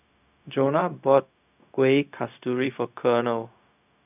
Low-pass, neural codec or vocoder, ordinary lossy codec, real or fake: 3.6 kHz; codec, 16 kHz, 0.4 kbps, LongCat-Audio-Codec; none; fake